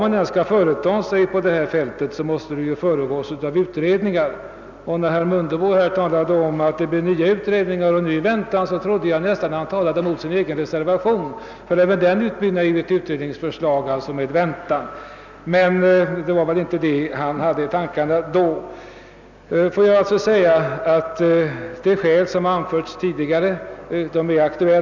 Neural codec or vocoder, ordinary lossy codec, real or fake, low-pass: none; none; real; 7.2 kHz